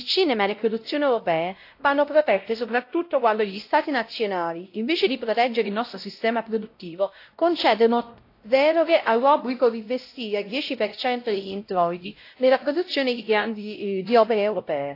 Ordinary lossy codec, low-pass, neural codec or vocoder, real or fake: AAC, 32 kbps; 5.4 kHz; codec, 16 kHz, 0.5 kbps, X-Codec, WavLM features, trained on Multilingual LibriSpeech; fake